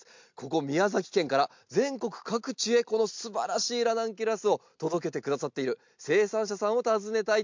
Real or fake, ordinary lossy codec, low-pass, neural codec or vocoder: real; MP3, 64 kbps; 7.2 kHz; none